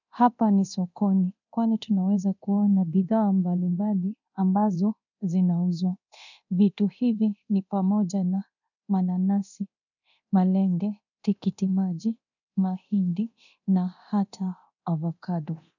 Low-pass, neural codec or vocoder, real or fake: 7.2 kHz; codec, 24 kHz, 0.9 kbps, DualCodec; fake